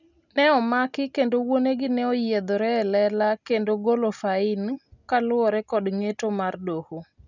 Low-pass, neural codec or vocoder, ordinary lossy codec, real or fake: 7.2 kHz; none; none; real